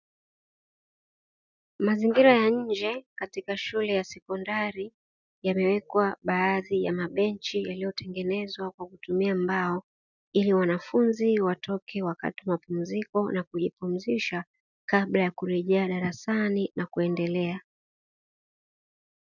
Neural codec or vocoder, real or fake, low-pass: none; real; 7.2 kHz